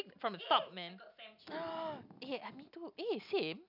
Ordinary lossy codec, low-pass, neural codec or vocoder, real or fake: none; 5.4 kHz; none; real